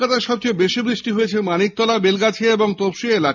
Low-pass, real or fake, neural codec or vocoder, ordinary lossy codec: 7.2 kHz; real; none; none